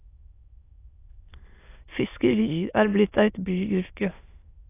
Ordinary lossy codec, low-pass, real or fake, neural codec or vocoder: AAC, 24 kbps; 3.6 kHz; fake; autoencoder, 22.05 kHz, a latent of 192 numbers a frame, VITS, trained on many speakers